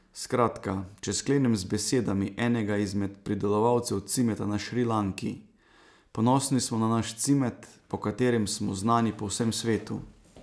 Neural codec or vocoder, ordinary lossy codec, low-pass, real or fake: none; none; none; real